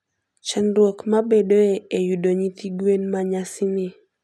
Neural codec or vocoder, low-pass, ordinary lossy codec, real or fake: none; none; none; real